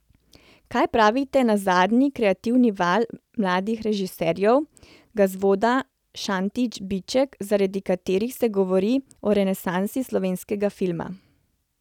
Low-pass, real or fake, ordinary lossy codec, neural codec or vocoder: 19.8 kHz; real; none; none